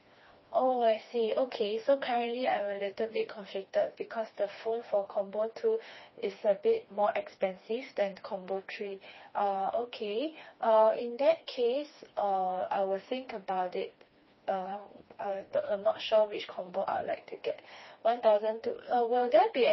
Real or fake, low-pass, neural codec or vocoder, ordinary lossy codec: fake; 7.2 kHz; codec, 16 kHz, 2 kbps, FreqCodec, smaller model; MP3, 24 kbps